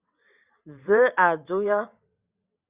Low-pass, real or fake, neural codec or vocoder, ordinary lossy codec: 3.6 kHz; fake; vocoder, 22.05 kHz, 80 mel bands, WaveNeXt; Opus, 64 kbps